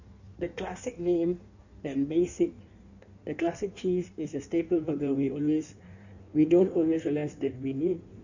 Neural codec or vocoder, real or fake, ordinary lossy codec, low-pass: codec, 16 kHz in and 24 kHz out, 1.1 kbps, FireRedTTS-2 codec; fake; none; 7.2 kHz